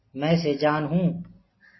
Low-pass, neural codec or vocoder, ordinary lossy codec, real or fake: 7.2 kHz; none; MP3, 24 kbps; real